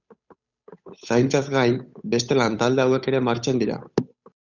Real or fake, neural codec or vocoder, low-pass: fake; codec, 16 kHz, 8 kbps, FunCodec, trained on Chinese and English, 25 frames a second; 7.2 kHz